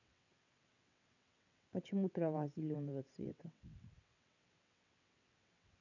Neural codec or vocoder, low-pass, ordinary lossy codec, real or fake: vocoder, 22.05 kHz, 80 mel bands, WaveNeXt; 7.2 kHz; none; fake